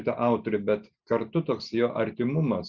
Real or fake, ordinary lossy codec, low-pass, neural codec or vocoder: real; Opus, 64 kbps; 7.2 kHz; none